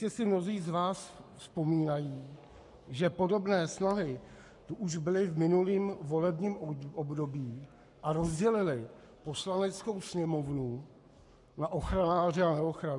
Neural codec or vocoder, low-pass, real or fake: codec, 44.1 kHz, 7.8 kbps, Pupu-Codec; 10.8 kHz; fake